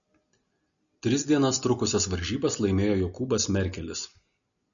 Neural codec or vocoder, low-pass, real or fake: none; 7.2 kHz; real